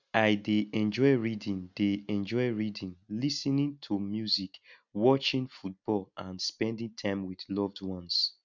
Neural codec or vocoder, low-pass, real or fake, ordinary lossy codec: none; 7.2 kHz; real; none